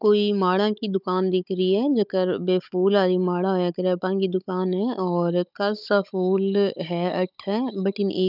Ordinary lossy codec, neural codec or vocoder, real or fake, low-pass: none; codec, 16 kHz, 16 kbps, FunCodec, trained on Chinese and English, 50 frames a second; fake; 5.4 kHz